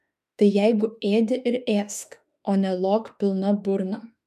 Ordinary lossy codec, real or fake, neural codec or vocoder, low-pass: MP3, 96 kbps; fake; autoencoder, 48 kHz, 32 numbers a frame, DAC-VAE, trained on Japanese speech; 14.4 kHz